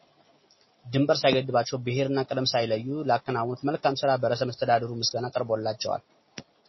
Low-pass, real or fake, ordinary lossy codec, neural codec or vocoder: 7.2 kHz; real; MP3, 24 kbps; none